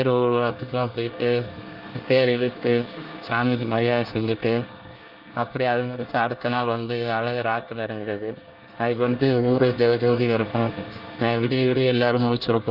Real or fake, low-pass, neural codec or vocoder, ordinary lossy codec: fake; 5.4 kHz; codec, 24 kHz, 1 kbps, SNAC; Opus, 24 kbps